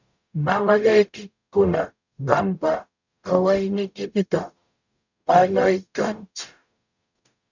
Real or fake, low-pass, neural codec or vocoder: fake; 7.2 kHz; codec, 44.1 kHz, 0.9 kbps, DAC